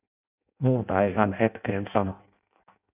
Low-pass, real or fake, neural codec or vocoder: 3.6 kHz; fake; codec, 16 kHz in and 24 kHz out, 0.6 kbps, FireRedTTS-2 codec